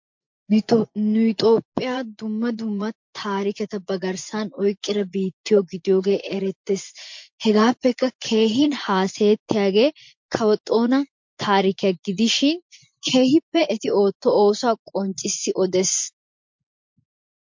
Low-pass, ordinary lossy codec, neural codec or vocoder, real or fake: 7.2 kHz; MP3, 48 kbps; vocoder, 24 kHz, 100 mel bands, Vocos; fake